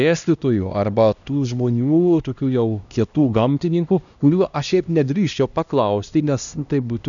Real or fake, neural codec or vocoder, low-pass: fake; codec, 16 kHz, 1 kbps, X-Codec, HuBERT features, trained on LibriSpeech; 7.2 kHz